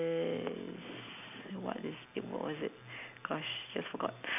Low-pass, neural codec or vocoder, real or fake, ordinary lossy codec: 3.6 kHz; none; real; none